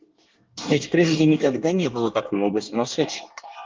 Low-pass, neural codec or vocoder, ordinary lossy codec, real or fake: 7.2 kHz; codec, 24 kHz, 1 kbps, SNAC; Opus, 32 kbps; fake